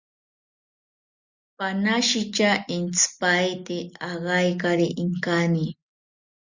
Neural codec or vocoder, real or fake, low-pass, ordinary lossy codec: none; real; 7.2 kHz; Opus, 64 kbps